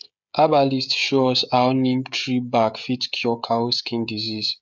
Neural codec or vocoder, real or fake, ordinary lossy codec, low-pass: codec, 16 kHz, 16 kbps, FreqCodec, smaller model; fake; none; 7.2 kHz